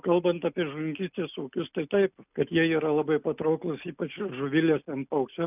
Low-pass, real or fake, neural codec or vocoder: 3.6 kHz; real; none